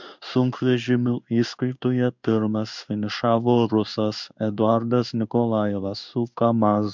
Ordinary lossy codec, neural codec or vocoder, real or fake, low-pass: MP3, 64 kbps; codec, 16 kHz in and 24 kHz out, 1 kbps, XY-Tokenizer; fake; 7.2 kHz